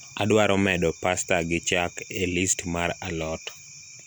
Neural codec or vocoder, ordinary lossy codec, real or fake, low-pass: none; none; real; none